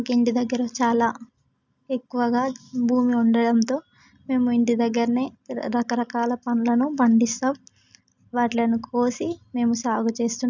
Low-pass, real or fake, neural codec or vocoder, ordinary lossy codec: 7.2 kHz; real; none; none